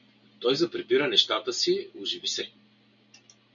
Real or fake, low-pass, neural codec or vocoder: real; 7.2 kHz; none